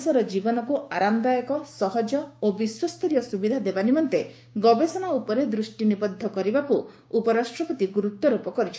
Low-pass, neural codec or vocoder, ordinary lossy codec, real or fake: none; codec, 16 kHz, 6 kbps, DAC; none; fake